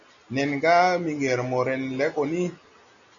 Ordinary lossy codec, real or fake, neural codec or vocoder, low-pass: MP3, 96 kbps; real; none; 7.2 kHz